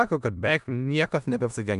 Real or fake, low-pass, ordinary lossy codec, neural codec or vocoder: fake; 10.8 kHz; AAC, 96 kbps; codec, 16 kHz in and 24 kHz out, 0.4 kbps, LongCat-Audio-Codec, four codebook decoder